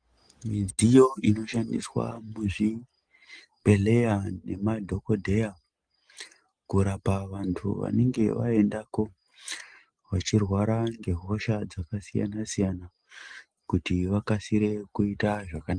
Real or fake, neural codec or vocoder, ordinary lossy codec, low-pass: real; none; Opus, 24 kbps; 9.9 kHz